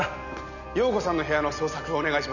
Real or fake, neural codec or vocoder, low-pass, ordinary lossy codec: real; none; 7.2 kHz; none